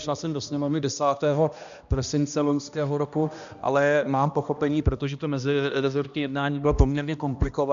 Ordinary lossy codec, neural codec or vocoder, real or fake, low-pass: AAC, 96 kbps; codec, 16 kHz, 1 kbps, X-Codec, HuBERT features, trained on balanced general audio; fake; 7.2 kHz